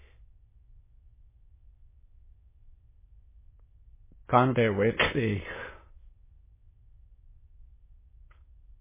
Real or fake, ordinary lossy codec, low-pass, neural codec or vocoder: fake; MP3, 16 kbps; 3.6 kHz; autoencoder, 22.05 kHz, a latent of 192 numbers a frame, VITS, trained on many speakers